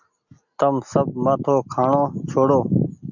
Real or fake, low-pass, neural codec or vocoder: real; 7.2 kHz; none